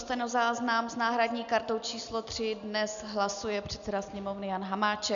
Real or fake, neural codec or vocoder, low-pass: real; none; 7.2 kHz